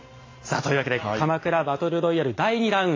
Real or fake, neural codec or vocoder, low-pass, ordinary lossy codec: real; none; 7.2 kHz; AAC, 32 kbps